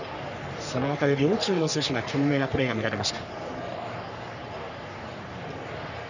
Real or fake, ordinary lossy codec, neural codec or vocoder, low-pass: fake; none; codec, 44.1 kHz, 3.4 kbps, Pupu-Codec; 7.2 kHz